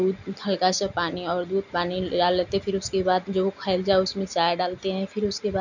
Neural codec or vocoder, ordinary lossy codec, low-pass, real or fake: none; none; 7.2 kHz; real